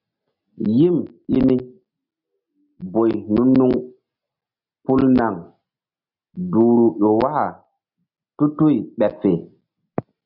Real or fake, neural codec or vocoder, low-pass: real; none; 5.4 kHz